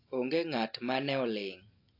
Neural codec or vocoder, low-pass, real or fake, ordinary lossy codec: none; 5.4 kHz; real; AAC, 32 kbps